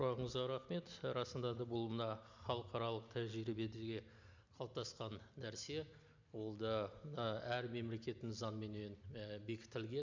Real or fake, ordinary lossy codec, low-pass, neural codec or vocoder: real; MP3, 64 kbps; 7.2 kHz; none